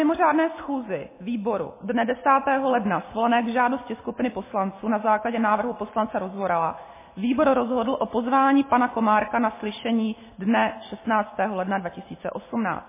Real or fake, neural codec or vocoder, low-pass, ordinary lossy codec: real; none; 3.6 kHz; MP3, 16 kbps